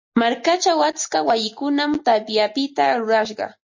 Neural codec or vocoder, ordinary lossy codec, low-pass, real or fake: none; MP3, 32 kbps; 7.2 kHz; real